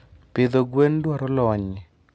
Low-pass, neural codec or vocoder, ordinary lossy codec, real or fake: none; none; none; real